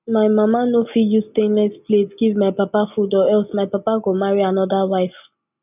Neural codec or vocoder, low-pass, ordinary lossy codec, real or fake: none; 3.6 kHz; none; real